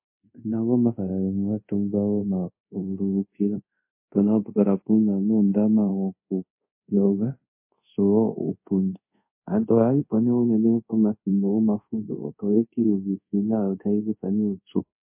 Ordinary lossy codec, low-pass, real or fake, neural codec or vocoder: MP3, 24 kbps; 3.6 kHz; fake; codec, 24 kHz, 0.5 kbps, DualCodec